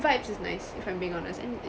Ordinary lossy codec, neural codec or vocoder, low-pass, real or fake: none; none; none; real